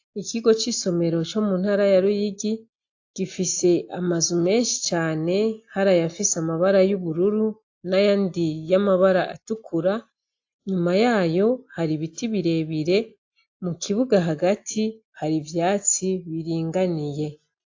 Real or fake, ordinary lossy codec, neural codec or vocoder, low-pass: real; AAC, 48 kbps; none; 7.2 kHz